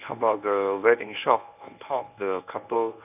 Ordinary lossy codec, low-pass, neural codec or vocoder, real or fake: none; 3.6 kHz; codec, 24 kHz, 0.9 kbps, WavTokenizer, medium speech release version 1; fake